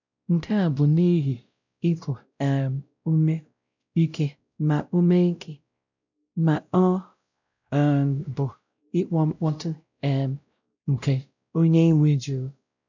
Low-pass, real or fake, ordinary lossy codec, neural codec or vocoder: 7.2 kHz; fake; none; codec, 16 kHz, 0.5 kbps, X-Codec, WavLM features, trained on Multilingual LibriSpeech